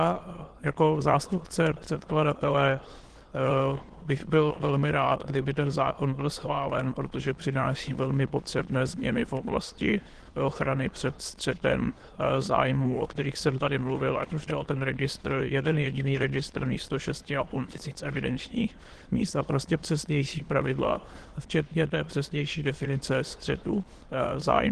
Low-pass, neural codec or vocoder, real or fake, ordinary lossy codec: 9.9 kHz; autoencoder, 22.05 kHz, a latent of 192 numbers a frame, VITS, trained on many speakers; fake; Opus, 16 kbps